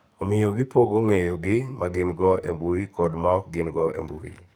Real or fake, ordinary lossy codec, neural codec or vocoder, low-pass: fake; none; codec, 44.1 kHz, 2.6 kbps, SNAC; none